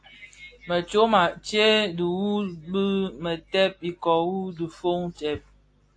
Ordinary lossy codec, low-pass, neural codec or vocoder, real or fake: AAC, 48 kbps; 9.9 kHz; none; real